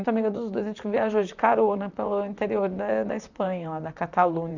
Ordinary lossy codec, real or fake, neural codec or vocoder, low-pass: none; fake; vocoder, 44.1 kHz, 128 mel bands, Pupu-Vocoder; 7.2 kHz